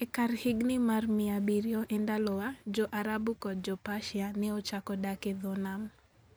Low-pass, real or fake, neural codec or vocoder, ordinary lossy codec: none; real; none; none